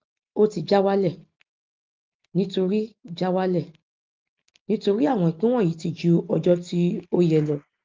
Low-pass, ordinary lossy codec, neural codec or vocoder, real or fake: 7.2 kHz; Opus, 32 kbps; none; real